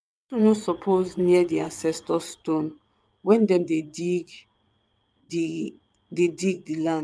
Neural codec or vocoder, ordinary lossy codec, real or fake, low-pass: vocoder, 22.05 kHz, 80 mel bands, WaveNeXt; none; fake; none